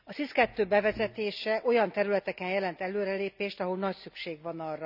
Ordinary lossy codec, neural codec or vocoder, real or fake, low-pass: none; none; real; 5.4 kHz